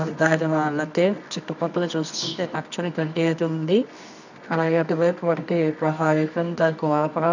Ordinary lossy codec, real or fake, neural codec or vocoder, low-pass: none; fake; codec, 24 kHz, 0.9 kbps, WavTokenizer, medium music audio release; 7.2 kHz